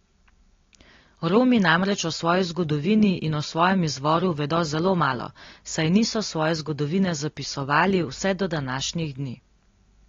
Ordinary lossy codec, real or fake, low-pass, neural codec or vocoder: AAC, 32 kbps; real; 7.2 kHz; none